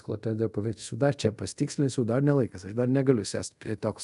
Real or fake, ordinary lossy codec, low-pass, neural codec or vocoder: fake; MP3, 96 kbps; 10.8 kHz; codec, 24 kHz, 0.5 kbps, DualCodec